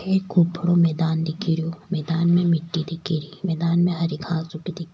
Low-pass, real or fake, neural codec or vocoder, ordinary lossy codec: none; real; none; none